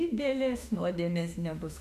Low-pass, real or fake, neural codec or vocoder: 14.4 kHz; fake; autoencoder, 48 kHz, 32 numbers a frame, DAC-VAE, trained on Japanese speech